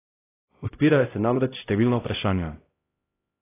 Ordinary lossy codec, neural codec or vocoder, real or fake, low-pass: AAC, 24 kbps; codec, 16 kHz, 0.5 kbps, X-Codec, HuBERT features, trained on LibriSpeech; fake; 3.6 kHz